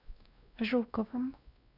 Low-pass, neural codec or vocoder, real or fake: 5.4 kHz; codec, 16 kHz, 1 kbps, X-Codec, WavLM features, trained on Multilingual LibriSpeech; fake